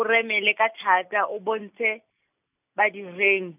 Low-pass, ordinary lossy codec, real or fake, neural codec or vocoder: 3.6 kHz; none; real; none